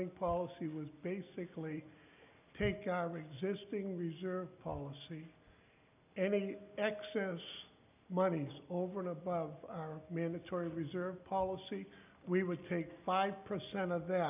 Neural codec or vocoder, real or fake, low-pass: none; real; 3.6 kHz